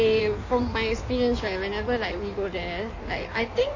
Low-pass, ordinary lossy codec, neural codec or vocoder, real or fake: 7.2 kHz; MP3, 32 kbps; codec, 16 kHz in and 24 kHz out, 1.1 kbps, FireRedTTS-2 codec; fake